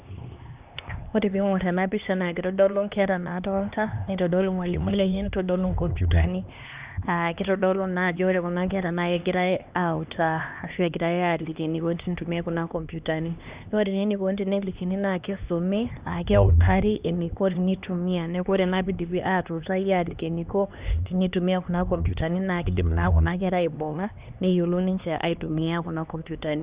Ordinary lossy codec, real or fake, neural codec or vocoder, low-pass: Opus, 64 kbps; fake; codec, 16 kHz, 2 kbps, X-Codec, HuBERT features, trained on LibriSpeech; 3.6 kHz